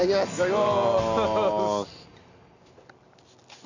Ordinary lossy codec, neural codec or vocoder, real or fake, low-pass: none; none; real; 7.2 kHz